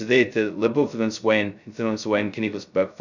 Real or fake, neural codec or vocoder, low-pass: fake; codec, 16 kHz, 0.2 kbps, FocalCodec; 7.2 kHz